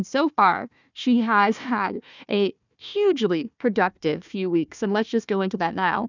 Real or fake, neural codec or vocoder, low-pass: fake; codec, 16 kHz, 1 kbps, FunCodec, trained on Chinese and English, 50 frames a second; 7.2 kHz